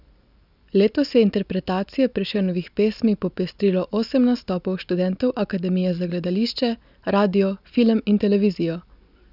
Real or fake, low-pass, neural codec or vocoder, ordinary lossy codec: real; 5.4 kHz; none; none